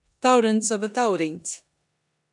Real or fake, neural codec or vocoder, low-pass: fake; codec, 16 kHz in and 24 kHz out, 0.9 kbps, LongCat-Audio-Codec, four codebook decoder; 10.8 kHz